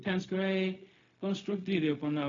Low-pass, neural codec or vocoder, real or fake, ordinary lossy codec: 7.2 kHz; codec, 16 kHz, 0.4 kbps, LongCat-Audio-Codec; fake; AAC, 32 kbps